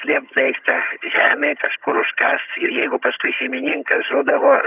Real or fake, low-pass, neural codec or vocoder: fake; 3.6 kHz; vocoder, 22.05 kHz, 80 mel bands, HiFi-GAN